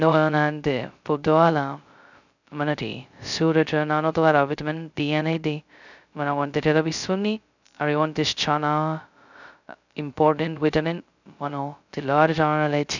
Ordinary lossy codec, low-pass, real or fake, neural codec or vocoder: none; 7.2 kHz; fake; codec, 16 kHz, 0.2 kbps, FocalCodec